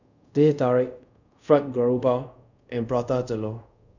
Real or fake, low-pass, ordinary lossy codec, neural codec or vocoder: fake; 7.2 kHz; none; codec, 24 kHz, 0.5 kbps, DualCodec